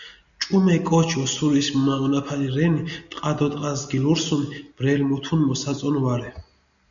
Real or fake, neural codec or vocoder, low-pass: real; none; 7.2 kHz